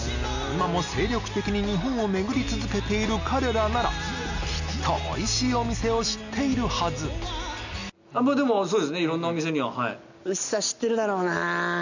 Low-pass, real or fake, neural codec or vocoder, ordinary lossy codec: 7.2 kHz; real; none; none